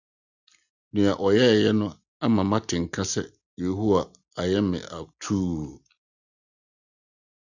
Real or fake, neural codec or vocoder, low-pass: real; none; 7.2 kHz